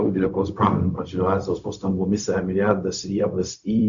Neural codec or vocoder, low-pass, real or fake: codec, 16 kHz, 0.4 kbps, LongCat-Audio-Codec; 7.2 kHz; fake